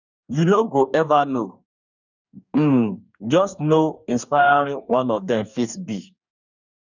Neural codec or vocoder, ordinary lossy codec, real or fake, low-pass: codec, 44.1 kHz, 2.6 kbps, DAC; none; fake; 7.2 kHz